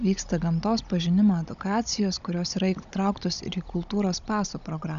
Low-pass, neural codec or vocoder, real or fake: 7.2 kHz; codec, 16 kHz, 16 kbps, FunCodec, trained on Chinese and English, 50 frames a second; fake